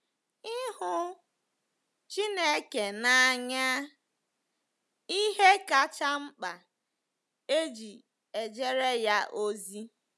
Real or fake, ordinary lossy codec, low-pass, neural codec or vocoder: real; none; none; none